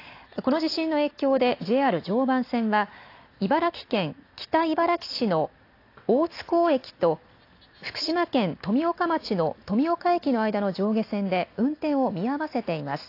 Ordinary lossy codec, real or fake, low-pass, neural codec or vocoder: AAC, 32 kbps; real; 5.4 kHz; none